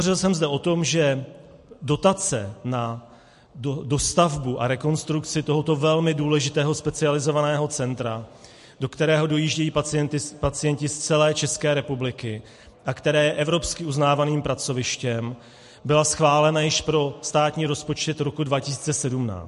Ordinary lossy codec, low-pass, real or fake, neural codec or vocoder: MP3, 48 kbps; 14.4 kHz; real; none